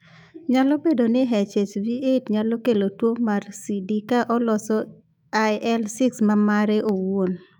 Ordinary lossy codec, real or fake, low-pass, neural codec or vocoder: none; fake; 19.8 kHz; autoencoder, 48 kHz, 128 numbers a frame, DAC-VAE, trained on Japanese speech